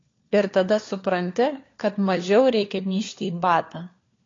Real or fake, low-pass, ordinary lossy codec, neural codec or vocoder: fake; 7.2 kHz; AAC, 32 kbps; codec, 16 kHz, 4 kbps, FunCodec, trained on LibriTTS, 50 frames a second